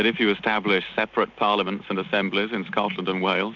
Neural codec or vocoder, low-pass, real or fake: none; 7.2 kHz; real